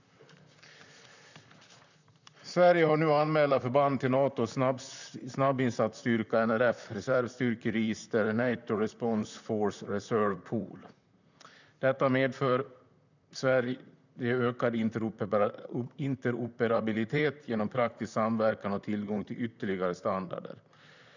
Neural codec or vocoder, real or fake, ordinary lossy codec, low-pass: vocoder, 44.1 kHz, 128 mel bands, Pupu-Vocoder; fake; none; 7.2 kHz